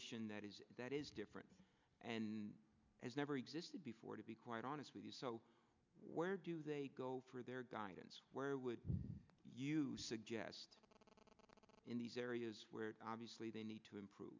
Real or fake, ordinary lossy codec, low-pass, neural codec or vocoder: real; MP3, 64 kbps; 7.2 kHz; none